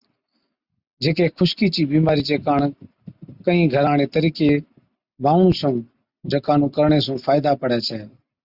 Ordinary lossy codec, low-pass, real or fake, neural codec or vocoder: Opus, 64 kbps; 5.4 kHz; real; none